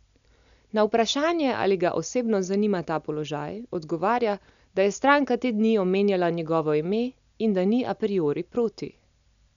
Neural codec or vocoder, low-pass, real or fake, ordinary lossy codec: none; 7.2 kHz; real; none